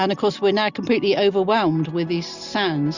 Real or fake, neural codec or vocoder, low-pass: real; none; 7.2 kHz